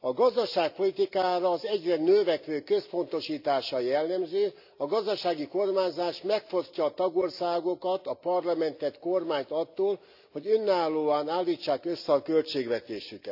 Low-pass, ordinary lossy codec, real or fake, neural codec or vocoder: 5.4 kHz; AAC, 48 kbps; real; none